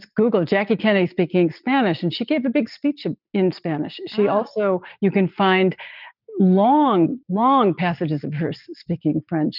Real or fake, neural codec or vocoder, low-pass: real; none; 5.4 kHz